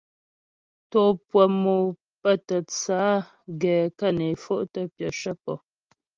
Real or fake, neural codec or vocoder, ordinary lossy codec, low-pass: real; none; Opus, 32 kbps; 7.2 kHz